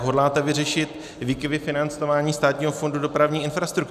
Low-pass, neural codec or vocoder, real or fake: 14.4 kHz; none; real